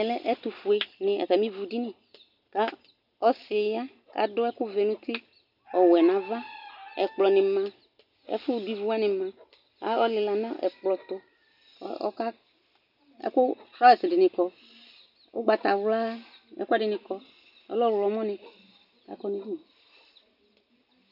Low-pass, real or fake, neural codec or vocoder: 5.4 kHz; real; none